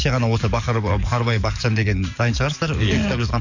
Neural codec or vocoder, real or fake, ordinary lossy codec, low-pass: codec, 44.1 kHz, 7.8 kbps, DAC; fake; none; 7.2 kHz